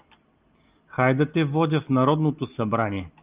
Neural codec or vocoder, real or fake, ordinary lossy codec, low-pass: none; real; Opus, 24 kbps; 3.6 kHz